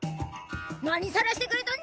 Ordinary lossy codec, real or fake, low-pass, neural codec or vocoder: none; real; none; none